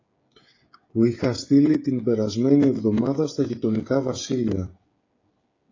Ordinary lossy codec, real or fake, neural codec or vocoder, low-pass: AAC, 32 kbps; fake; codec, 16 kHz, 16 kbps, FreqCodec, smaller model; 7.2 kHz